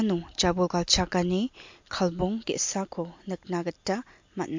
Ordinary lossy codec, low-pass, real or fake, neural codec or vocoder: MP3, 48 kbps; 7.2 kHz; real; none